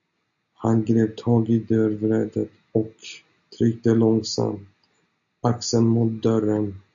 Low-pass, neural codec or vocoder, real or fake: 7.2 kHz; none; real